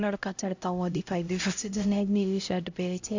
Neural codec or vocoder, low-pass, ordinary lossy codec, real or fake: codec, 16 kHz, 0.5 kbps, X-Codec, HuBERT features, trained on LibriSpeech; 7.2 kHz; AAC, 48 kbps; fake